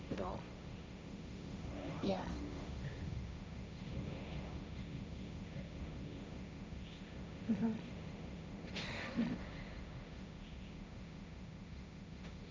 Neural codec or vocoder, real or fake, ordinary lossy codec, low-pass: codec, 16 kHz, 1.1 kbps, Voila-Tokenizer; fake; none; none